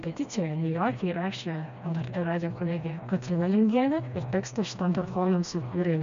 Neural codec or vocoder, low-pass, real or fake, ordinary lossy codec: codec, 16 kHz, 1 kbps, FreqCodec, smaller model; 7.2 kHz; fake; MP3, 64 kbps